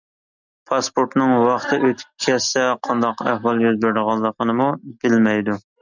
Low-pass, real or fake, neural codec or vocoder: 7.2 kHz; real; none